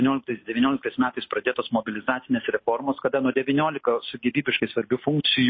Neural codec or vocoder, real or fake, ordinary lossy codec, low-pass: none; real; MP3, 24 kbps; 7.2 kHz